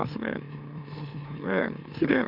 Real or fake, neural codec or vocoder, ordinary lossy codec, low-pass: fake; autoencoder, 44.1 kHz, a latent of 192 numbers a frame, MeloTTS; none; 5.4 kHz